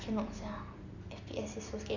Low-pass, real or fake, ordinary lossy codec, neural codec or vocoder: 7.2 kHz; real; none; none